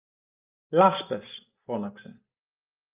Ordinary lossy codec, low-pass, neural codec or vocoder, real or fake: Opus, 24 kbps; 3.6 kHz; none; real